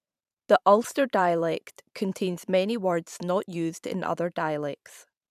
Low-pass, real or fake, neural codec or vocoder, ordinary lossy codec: 14.4 kHz; fake; vocoder, 44.1 kHz, 128 mel bands every 512 samples, BigVGAN v2; none